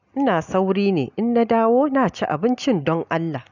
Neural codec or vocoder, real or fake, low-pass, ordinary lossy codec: none; real; 7.2 kHz; none